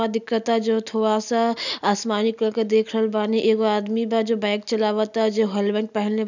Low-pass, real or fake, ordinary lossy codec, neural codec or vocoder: 7.2 kHz; real; none; none